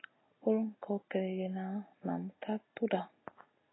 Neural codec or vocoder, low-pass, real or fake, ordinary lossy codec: none; 7.2 kHz; real; AAC, 16 kbps